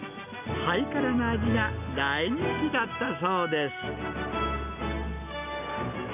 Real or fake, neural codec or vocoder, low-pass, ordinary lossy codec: real; none; 3.6 kHz; none